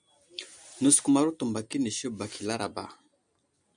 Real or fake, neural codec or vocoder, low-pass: real; none; 9.9 kHz